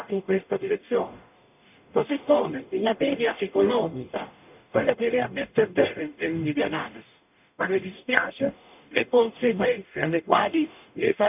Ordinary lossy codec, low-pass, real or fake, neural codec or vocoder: none; 3.6 kHz; fake; codec, 44.1 kHz, 0.9 kbps, DAC